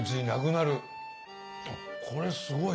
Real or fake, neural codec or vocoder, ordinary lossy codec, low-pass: real; none; none; none